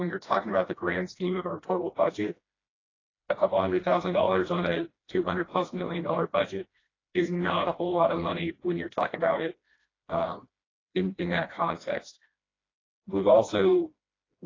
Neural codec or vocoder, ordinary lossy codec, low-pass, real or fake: codec, 16 kHz, 1 kbps, FreqCodec, smaller model; AAC, 32 kbps; 7.2 kHz; fake